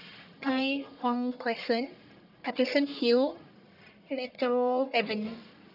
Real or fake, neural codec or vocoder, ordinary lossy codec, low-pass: fake; codec, 44.1 kHz, 1.7 kbps, Pupu-Codec; none; 5.4 kHz